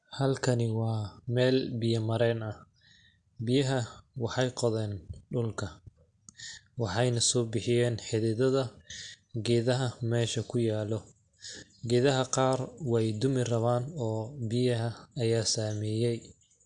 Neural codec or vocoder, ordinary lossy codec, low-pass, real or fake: none; AAC, 64 kbps; 9.9 kHz; real